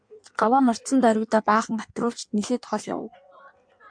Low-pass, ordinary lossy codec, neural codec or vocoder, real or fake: 9.9 kHz; MP3, 64 kbps; codec, 16 kHz in and 24 kHz out, 1.1 kbps, FireRedTTS-2 codec; fake